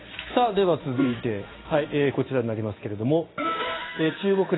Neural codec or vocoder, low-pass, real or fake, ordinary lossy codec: codec, 16 kHz in and 24 kHz out, 1 kbps, XY-Tokenizer; 7.2 kHz; fake; AAC, 16 kbps